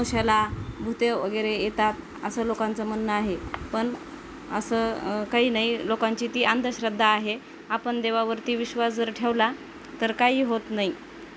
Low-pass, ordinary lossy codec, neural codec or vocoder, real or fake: none; none; none; real